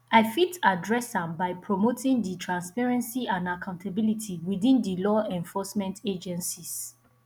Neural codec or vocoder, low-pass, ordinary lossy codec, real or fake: none; 19.8 kHz; none; real